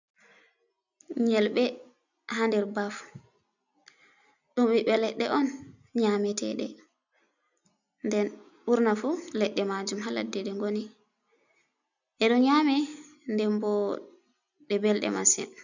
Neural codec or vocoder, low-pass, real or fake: none; 7.2 kHz; real